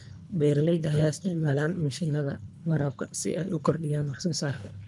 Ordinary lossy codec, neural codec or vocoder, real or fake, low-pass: none; codec, 24 kHz, 3 kbps, HILCodec; fake; 10.8 kHz